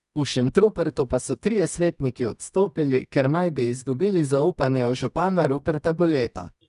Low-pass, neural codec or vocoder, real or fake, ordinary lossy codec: 10.8 kHz; codec, 24 kHz, 0.9 kbps, WavTokenizer, medium music audio release; fake; none